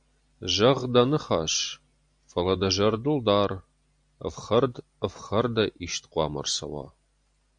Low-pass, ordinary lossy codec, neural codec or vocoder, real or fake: 9.9 kHz; AAC, 64 kbps; none; real